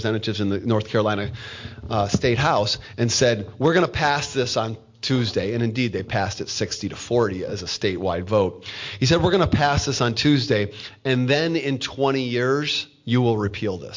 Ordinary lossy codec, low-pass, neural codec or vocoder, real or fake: MP3, 48 kbps; 7.2 kHz; none; real